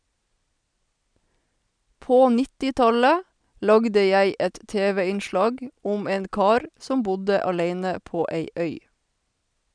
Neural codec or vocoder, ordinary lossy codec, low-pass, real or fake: none; none; 9.9 kHz; real